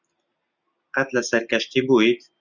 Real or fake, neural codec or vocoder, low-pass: real; none; 7.2 kHz